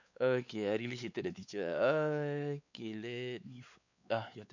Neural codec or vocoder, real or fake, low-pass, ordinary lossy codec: codec, 16 kHz, 4 kbps, X-Codec, WavLM features, trained on Multilingual LibriSpeech; fake; 7.2 kHz; none